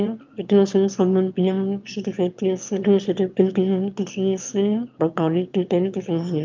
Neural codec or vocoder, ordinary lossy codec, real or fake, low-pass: autoencoder, 22.05 kHz, a latent of 192 numbers a frame, VITS, trained on one speaker; Opus, 24 kbps; fake; 7.2 kHz